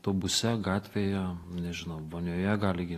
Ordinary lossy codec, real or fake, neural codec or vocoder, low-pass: AAC, 48 kbps; real; none; 14.4 kHz